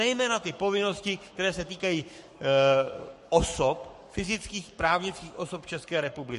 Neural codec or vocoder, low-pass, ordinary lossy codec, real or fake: codec, 44.1 kHz, 7.8 kbps, Pupu-Codec; 14.4 kHz; MP3, 48 kbps; fake